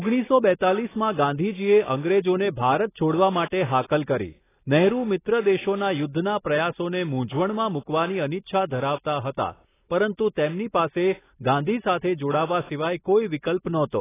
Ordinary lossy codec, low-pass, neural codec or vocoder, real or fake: AAC, 16 kbps; 3.6 kHz; none; real